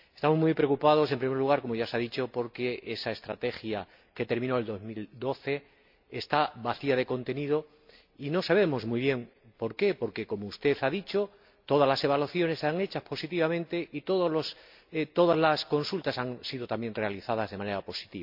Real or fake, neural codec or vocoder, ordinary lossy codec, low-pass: real; none; MP3, 48 kbps; 5.4 kHz